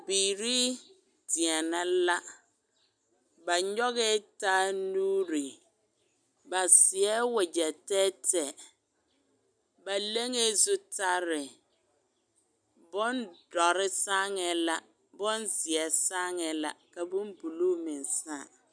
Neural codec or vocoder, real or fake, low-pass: none; real; 9.9 kHz